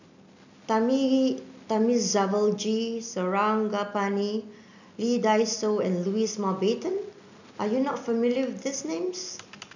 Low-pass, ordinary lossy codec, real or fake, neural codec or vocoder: 7.2 kHz; none; real; none